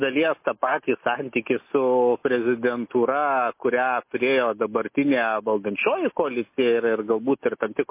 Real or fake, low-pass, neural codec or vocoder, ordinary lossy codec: real; 3.6 kHz; none; MP3, 24 kbps